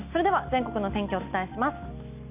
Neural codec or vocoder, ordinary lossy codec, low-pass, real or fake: none; none; 3.6 kHz; real